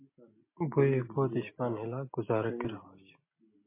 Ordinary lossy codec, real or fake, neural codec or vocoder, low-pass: MP3, 24 kbps; real; none; 3.6 kHz